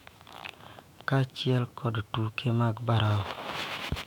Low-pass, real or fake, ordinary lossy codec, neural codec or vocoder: 19.8 kHz; fake; none; autoencoder, 48 kHz, 128 numbers a frame, DAC-VAE, trained on Japanese speech